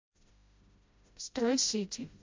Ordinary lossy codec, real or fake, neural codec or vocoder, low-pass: MP3, 48 kbps; fake; codec, 16 kHz, 0.5 kbps, FreqCodec, smaller model; 7.2 kHz